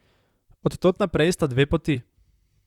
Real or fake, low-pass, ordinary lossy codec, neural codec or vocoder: fake; 19.8 kHz; Opus, 64 kbps; vocoder, 44.1 kHz, 128 mel bands, Pupu-Vocoder